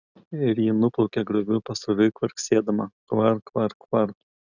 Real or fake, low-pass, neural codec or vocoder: real; 7.2 kHz; none